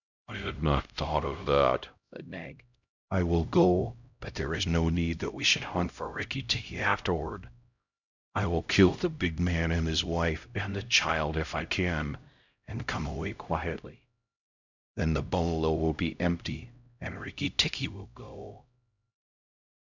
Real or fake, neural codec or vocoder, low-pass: fake; codec, 16 kHz, 0.5 kbps, X-Codec, HuBERT features, trained on LibriSpeech; 7.2 kHz